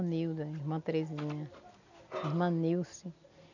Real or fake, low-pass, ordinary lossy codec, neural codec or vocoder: real; 7.2 kHz; none; none